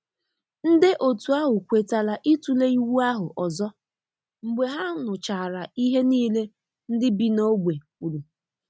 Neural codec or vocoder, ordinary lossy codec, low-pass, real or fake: none; none; none; real